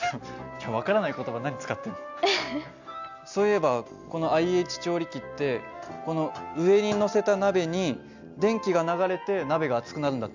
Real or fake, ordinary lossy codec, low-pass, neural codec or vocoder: real; none; 7.2 kHz; none